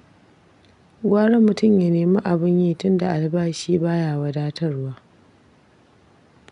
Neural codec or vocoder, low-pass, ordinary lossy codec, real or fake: none; 10.8 kHz; none; real